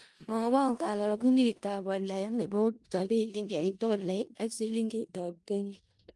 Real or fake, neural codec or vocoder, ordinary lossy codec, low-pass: fake; codec, 16 kHz in and 24 kHz out, 0.4 kbps, LongCat-Audio-Codec, four codebook decoder; Opus, 32 kbps; 10.8 kHz